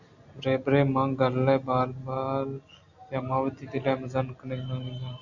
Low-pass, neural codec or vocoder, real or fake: 7.2 kHz; none; real